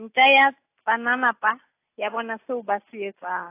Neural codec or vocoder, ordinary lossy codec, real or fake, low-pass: none; AAC, 24 kbps; real; 3.6 kHz